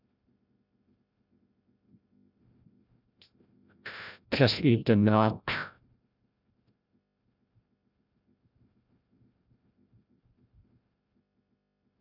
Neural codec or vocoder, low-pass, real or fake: codec, 16 kHz, 0.5 kbps, FreqCodec, larger model; 5.4 kHz; fake